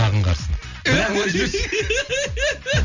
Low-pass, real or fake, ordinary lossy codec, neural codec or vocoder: 7.2 kHz; real; none; none